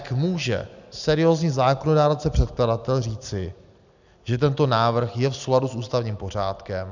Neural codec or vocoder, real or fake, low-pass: none; real; 7.2 kHz